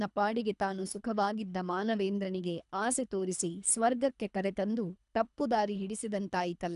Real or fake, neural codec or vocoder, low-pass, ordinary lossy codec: fake; codec, 24 kHz, 3 kbps, HILCodec; 10.8 kHz; none